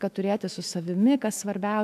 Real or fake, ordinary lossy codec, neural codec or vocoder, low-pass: fake; AAC, 96 kbps; autoencoder, 48 kHz, 128 numbers a frame, DAC-VAE, trained on Japanese speech; 14.4 kHz